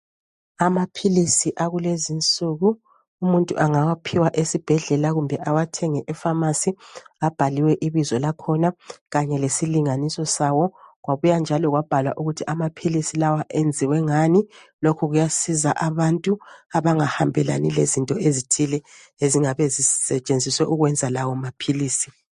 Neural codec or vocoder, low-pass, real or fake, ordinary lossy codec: none; 14.4 kHz; real; MP3, 48 kbps